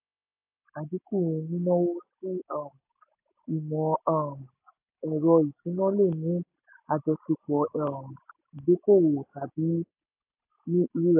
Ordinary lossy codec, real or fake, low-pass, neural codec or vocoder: AAC, 32 kbps; real; 3.6 kHz; none